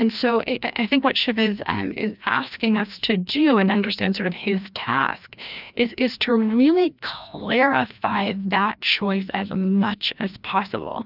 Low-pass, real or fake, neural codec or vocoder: 5.4 kHz; fake; codec, 16 kHz, 1 kbps, FreqCodec, larger model